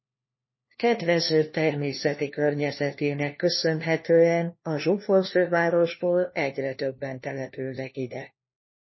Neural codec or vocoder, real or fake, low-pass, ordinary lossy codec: codec, 16 kHz, 1 kbps, FunCodec, trained on LibriTTS, 50 frames a second; fake; 7.2 kHz; MP3, 24 kbps